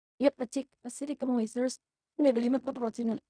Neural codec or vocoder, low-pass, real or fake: codec, 16 kHz in and 24 kHz out, 0.4 kbps, LongCat-Audio-Codec, fine tuned four codebook decoder; 9.9 kHz; fake